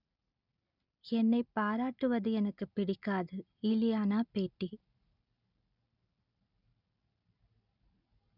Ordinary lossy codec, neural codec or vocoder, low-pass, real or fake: none; none; 5.4 kHz; real